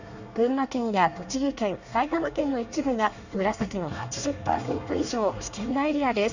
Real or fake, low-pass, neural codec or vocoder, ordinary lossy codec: fake; 7.2 kHz; codec, 24 kHz, 1 kbps, SNAC; none